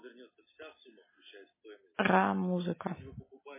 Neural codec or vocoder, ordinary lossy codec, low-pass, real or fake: none; MP3, 16 kbps; 3.6 kHz; real